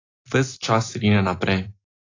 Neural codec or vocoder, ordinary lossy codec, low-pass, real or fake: none; AAC, 32 kbps; 7.2 kHz; real